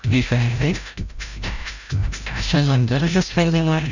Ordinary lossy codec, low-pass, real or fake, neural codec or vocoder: none; 7.2 kHz; fake; codec, 16 kHz, 0.5 kbps, FreqCodec, larger model